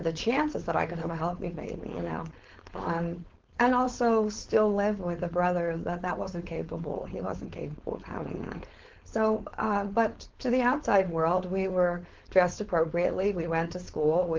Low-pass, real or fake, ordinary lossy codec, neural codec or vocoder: 7.2 kHz; fake; Opus, 16 kbps; codec, 16 kHz, 4.8 kbps, FACodec